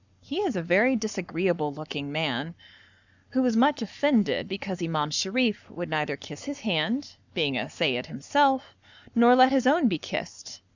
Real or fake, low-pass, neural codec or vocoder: fake; 7.2 kHz; codec, 44.1 kHz, 7.8 kbps, Pupu-Codec